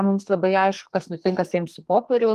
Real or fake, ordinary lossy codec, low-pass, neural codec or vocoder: fake; Opus, 24 kbps; 14.4 kHz; codec, 44.1 kHz, 2.6 kbps, SNAC